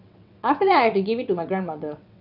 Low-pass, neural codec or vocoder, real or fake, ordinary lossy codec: 5.4 kHz; vocoder, 22.05 kHz, 80 mel bands, WaveNeXt; fake; none